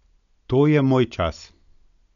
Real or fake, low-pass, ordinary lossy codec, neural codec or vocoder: real; 7.2 kHz; none; none